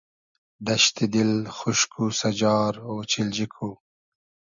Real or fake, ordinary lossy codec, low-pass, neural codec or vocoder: real; MP3, 96 kbps; 7.2 kHz; none